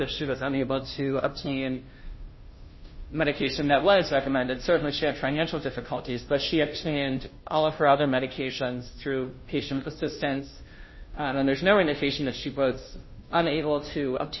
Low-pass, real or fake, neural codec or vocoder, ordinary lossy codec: 7.2 kHz; fake; codec, 16 kHz, 0.5 kbps, FunCodec, trained on Chinese and English, 25 frames a second; MP3, 24 kbps